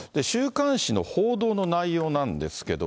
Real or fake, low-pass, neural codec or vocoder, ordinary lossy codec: real; none; none; none